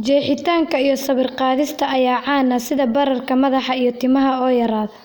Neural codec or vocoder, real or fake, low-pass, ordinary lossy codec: none; real; none; none